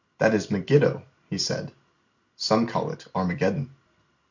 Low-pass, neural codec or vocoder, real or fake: 7.2 kHz; none; real